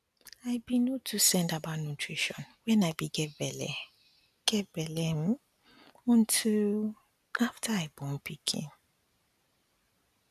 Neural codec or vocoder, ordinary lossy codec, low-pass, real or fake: none; none; 14.4 kHz; real